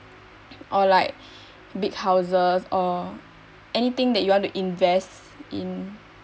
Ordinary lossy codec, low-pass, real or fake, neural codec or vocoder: none; none; real; none